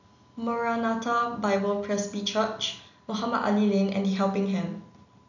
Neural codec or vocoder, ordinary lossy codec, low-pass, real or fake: none; none; 7.2 kHz; real